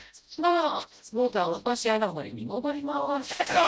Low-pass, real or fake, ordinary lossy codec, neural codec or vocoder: none; fake; none; codec, 16 kHz, 0.5 kbps, FreqCodec, smaller model